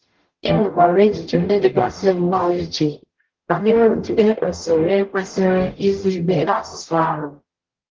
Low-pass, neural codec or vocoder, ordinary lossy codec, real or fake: 7.2 kHz; codec, 44.1 kHz, 0.9 kbps, DAC; Opus, 16 kbps; fake